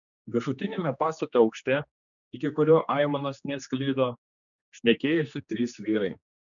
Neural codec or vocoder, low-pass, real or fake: codec, 16 kHz, 2 kbps, X-Codec, HuBERT features, trained on general audio; 7.2 kHz; fake